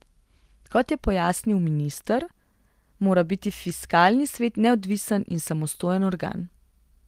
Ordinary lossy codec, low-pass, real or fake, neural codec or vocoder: Opus, 24 kbps; 14.4 kHz; real; none